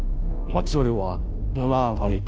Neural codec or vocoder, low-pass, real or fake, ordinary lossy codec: codec, 16 kHz, 0.5 kbps, FunCodec, trained on Chinese and English, 25 frames a second; none; fake; none